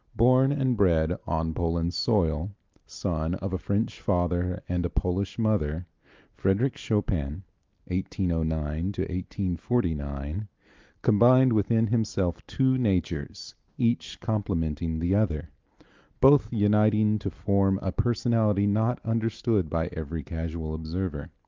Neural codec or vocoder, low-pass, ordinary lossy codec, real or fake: none; 7.2 kHz; Opus, 32 kbps; real